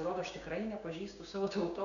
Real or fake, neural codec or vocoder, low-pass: fake; codec, 16 kHz, 6 kbps, DAC; 7.2 kHz